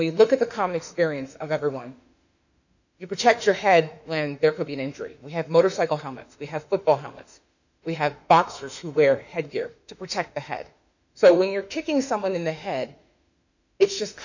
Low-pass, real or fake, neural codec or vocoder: 7.2 kHz; fake; autoencoder, 48 kHz, 32 numbers a frame, DAC-VAE, trained on Japanese speech